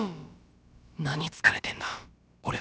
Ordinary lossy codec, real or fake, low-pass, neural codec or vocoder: none; fake; none; codec, 16 kHz, about 1 kbps, DyCAST, with the encoder's durations